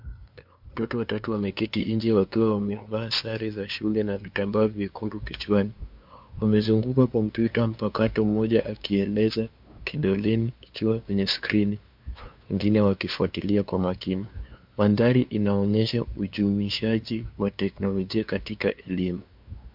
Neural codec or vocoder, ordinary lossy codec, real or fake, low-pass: codec, 16 kHz, 2 kbps, FunCodec, trained on LibriTTS, 25 frames a second; MP3, 48 kbps; fake; 5.4 kHz